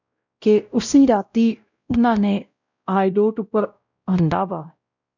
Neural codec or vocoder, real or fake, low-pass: codec, 16 kHz, 0.5 kbps, X-Codec, WavLM features, trained on Multilingual LibriSpeech; fake; 7.2 kHz